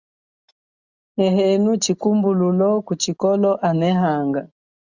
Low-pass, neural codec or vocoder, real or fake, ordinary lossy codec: 7.2 kHz; none; real; Opus, 64 kbps